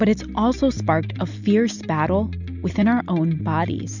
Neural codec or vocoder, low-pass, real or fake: none; 7.2 kHz; real